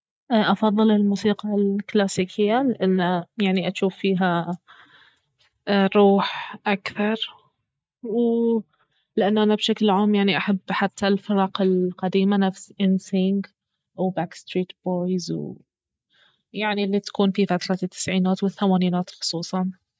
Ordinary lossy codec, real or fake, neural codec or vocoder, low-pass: none; real; none; none